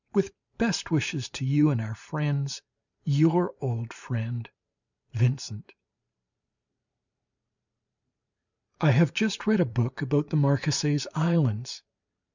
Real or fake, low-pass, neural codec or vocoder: fake; 7.2 kHz; vocoder, 44.1 kHz, 128 mel bands every 512 samples, BigVGAN v2